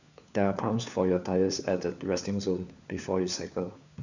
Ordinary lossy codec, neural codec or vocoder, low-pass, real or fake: none; codec, 16 kHz, 4 kbps, FunCodec, trained on LibriTTS, 50 frames a second; 7.2 kHz; fake